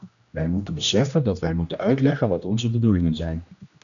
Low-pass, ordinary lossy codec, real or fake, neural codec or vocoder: 7.2 kHz; MP3, 96 kbps; fake; codec, 16 kHz, 1 kbps, X-Codec, HuBERT features, trained on general audio